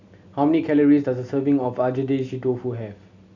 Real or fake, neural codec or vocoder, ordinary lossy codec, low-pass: real; none; none; 7.2 kHz